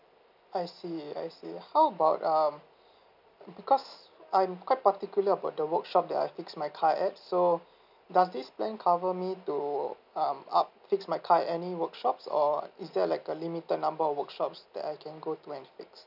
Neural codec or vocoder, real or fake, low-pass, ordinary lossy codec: none; real; 5.4 kHz; none